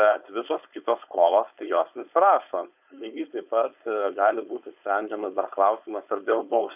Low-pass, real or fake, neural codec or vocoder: 3.6 kHz; fake; codec, 16 kHz, 4.8 kbps, FACodec